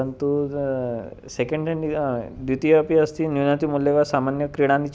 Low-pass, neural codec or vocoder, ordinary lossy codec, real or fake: none; none; none; real